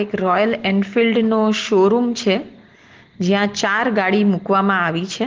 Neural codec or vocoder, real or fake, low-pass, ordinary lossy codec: none; real; 7.2 kHz; Opus, 16 kbps